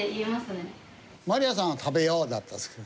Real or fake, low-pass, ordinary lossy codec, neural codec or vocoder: real; none; none; none